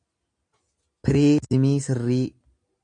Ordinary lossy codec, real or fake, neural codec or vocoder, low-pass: AAC, 64 kbps; real; none; 9.9 kHz